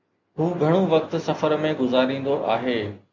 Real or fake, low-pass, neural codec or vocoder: real; 7.2 kHz; none